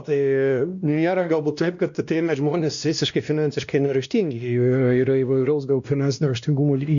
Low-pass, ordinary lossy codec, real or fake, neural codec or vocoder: 7.2 kHz; MP3, 96 kbps; fake; codec, 16 kHz, 1 kbps, X-Codec, WavLM features, trained on Multilingual LibriSpeech